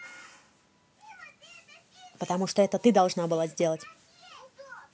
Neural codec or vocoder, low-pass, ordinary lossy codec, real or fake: none; none; none; real